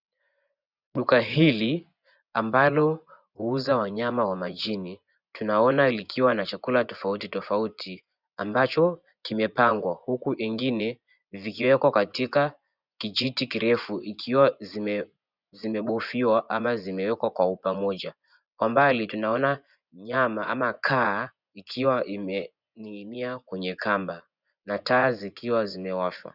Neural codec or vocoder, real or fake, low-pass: vocoder, 24 kHz, 100 mel bands, Vocos; fake; 5.4 kHz